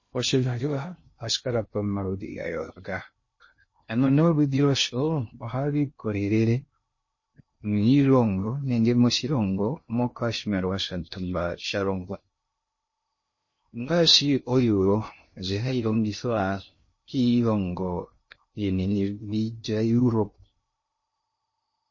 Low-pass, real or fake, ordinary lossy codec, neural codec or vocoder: 7.2 kHz; fake; MP3, 32 kbps; codec, 16 kHz in and 24 kHz out, 0.6 kbps, FocalCodec, streaming, 2048 codes